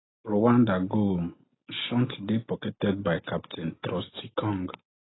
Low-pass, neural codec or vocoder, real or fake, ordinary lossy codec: 7.2 kHz; none; real; AAC, 16 kbps